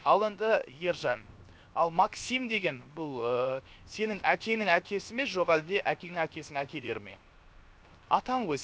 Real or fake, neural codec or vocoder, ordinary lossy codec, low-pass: fake; codec, 16 kHz, 0.7 kbps, FocalCodec; none; none